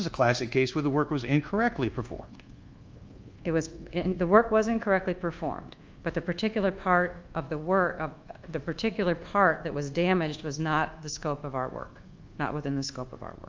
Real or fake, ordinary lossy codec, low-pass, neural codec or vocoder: fake; Opus, 24 kbps; 7.2 kHz; codec, 24 kHz, 1.2 kbps, DualCodec